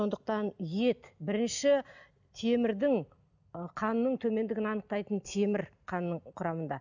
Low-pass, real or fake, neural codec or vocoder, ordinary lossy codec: 7.2 kHz; real; none; none